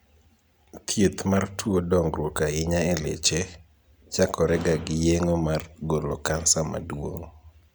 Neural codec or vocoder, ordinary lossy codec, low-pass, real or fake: none; none; none; real